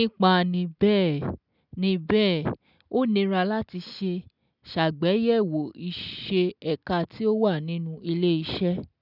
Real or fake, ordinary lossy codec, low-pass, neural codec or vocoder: real; none; 5.4 kHz; none